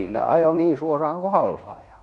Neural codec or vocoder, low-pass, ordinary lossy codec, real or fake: codec, 16 kHz in and 24 kHz out, 0.9 kbps, LongCat-Audio-Codec, fine tuned four codebook decoder; 10.8 kHz; none; fake